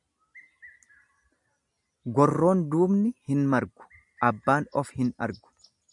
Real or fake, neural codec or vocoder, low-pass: real; none; 10.8 kHz